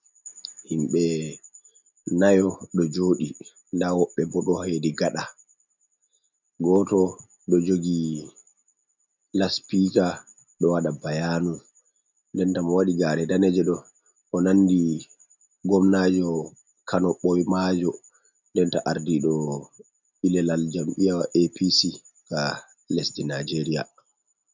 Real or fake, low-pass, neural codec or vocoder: real; 7.2 kHz; none